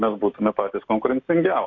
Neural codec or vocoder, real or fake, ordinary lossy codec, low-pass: none; real; AAC, 48 kbps; 7.2 kHz